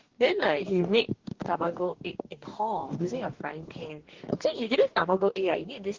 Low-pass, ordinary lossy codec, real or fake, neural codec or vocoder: 7.2 kHz; Opus, 16 kbps; fake; codec, 44.1 kHz, 2.6 kbps, DAC